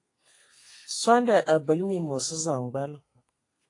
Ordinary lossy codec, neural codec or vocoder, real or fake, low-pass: AAC, 48 kbps; codec, 32 kHz, 1.9 kbps, SNAC; fake; 10.8 kHz